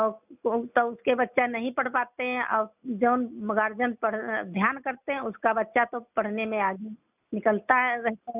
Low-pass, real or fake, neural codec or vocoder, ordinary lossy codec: 3.6 kHz; real; none; none